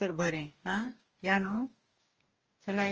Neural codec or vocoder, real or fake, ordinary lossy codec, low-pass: codec, 44.1 kHz, 2.6 kbps, DAC; fake; Opus, 24 kbps; 7.2 kHz